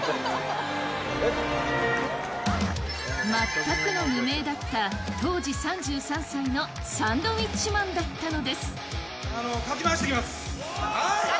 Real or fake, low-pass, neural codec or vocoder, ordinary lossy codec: real; none; none; none